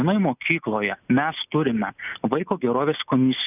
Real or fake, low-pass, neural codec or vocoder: real; 3.6 kHz; none